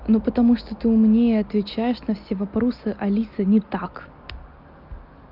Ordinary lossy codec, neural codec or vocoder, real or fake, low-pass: Opus, 32 kbps; none; real; 5.4 kHz